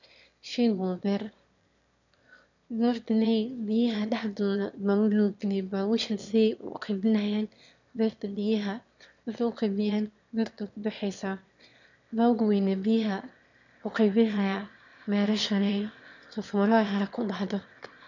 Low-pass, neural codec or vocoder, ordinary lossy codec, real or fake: 7.2 kHz; autoencoder, 22.05 kHz, a latent of 192 numbers a frame, VITS, trained on one speaker; none; fake